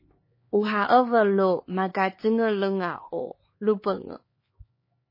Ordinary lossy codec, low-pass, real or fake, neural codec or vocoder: MP3, 24 kbps; 5.4 kHz; fake; codec, 16 kHz, 4 kbps, X-Codec, HuBERT features, trained on LibriSpeech